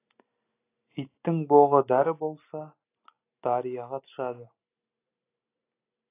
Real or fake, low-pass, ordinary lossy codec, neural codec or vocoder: real; 3.6 kHz; AAC, 24 kbps; none